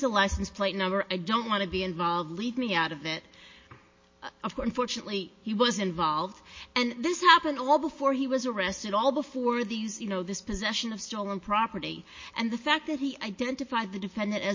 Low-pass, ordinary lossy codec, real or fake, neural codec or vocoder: 7.2 kHz; MP3, 32 kbps; real; none